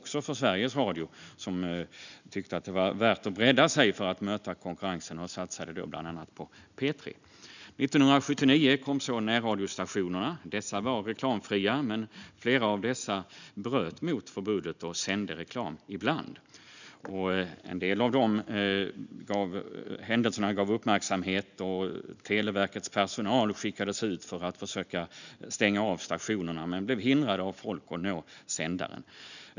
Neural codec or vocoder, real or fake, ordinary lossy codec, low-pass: none; real; none; 7.2 kHz